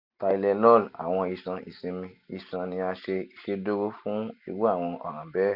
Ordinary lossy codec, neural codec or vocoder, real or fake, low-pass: none; none; real; 5.4 kHz